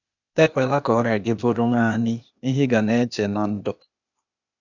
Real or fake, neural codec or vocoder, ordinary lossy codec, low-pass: fake; codec, 16 kHz, 0.8 kbps, ZipCodec; none; 7.2 kHz